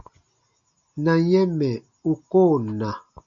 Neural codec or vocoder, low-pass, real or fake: none; 7.2 kHz; real